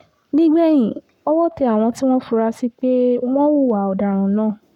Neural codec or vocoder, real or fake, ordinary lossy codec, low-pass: codec, 44.1 kHz, 7.8 kbps, Pupu-Codec; fake; none; 19.8 kHz